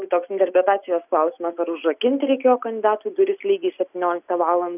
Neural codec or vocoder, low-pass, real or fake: none; 3.6 kHz; real